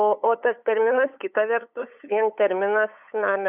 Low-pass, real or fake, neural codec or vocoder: 3.6 kHz; fake; codec, 16 kHz, 8 kbps, FunCodec, trained on LibriTTS, 25 frames a second